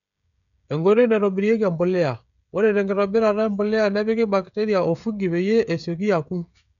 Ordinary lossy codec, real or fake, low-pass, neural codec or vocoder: none; fake; 7.2 kHz; codec, 16 kHz, 16 kbps, FreqCodec, smaller model